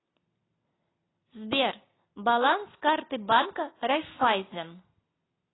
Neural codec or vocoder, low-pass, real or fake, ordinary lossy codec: none; 7.2 kHz; real; AAC, 16 kbps